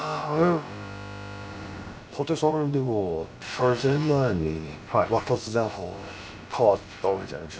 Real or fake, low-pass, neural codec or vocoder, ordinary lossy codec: fake; none; codec, 16 kHz, about 1 kbps, DyCAST, with the encoder's durations; none